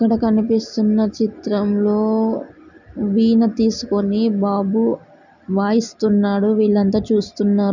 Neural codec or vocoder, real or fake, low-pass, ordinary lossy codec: none; real; 7.2 kHz; none